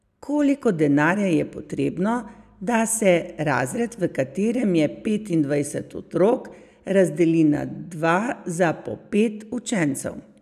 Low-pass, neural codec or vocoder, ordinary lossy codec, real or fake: 14.4 kHz; none; none; real